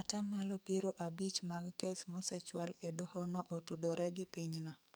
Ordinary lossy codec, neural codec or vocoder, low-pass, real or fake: none; codec, 44.1 kHz, 2.6 kbps, SNAC; none; fake